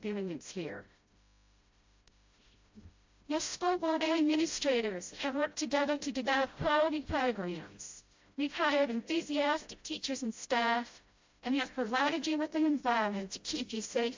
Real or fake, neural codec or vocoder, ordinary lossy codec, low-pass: fake; codec, 16 kHz, 0.5 kbps, FreqCodec, smaller model; MP3, 48 kbps; 7.2 kHz